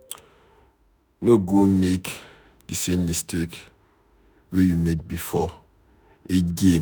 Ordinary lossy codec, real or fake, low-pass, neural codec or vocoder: none; fake; none; autoencoder, 48 kHz, 32 numbers a frame, DAC-VAE, trained on Japanese speech